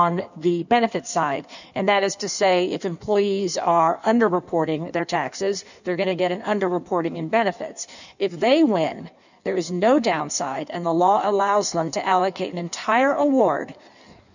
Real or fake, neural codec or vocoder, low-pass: fake; codec, 16 kHz in and 24 kHz out, 1.1 kbps, FireRedTTS-2 codec; 7.2 kHz